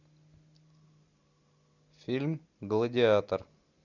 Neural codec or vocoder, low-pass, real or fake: none; 7.2 kHz; real